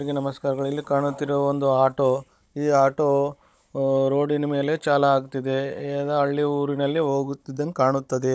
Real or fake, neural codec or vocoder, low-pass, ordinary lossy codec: fake; codec, 16 kHz, 16 kbps, FunCodec, trained on Chinese and English, 50 frames a second; none; none